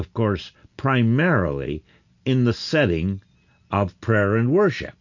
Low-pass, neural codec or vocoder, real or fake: 7.2 kHz; none; real